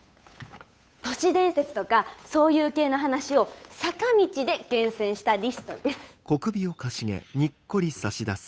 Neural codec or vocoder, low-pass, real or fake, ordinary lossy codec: codec, 16 kHz, 8 kbps, FunCodec, trained on Chinese and English, 25 frames a second; none; fake; none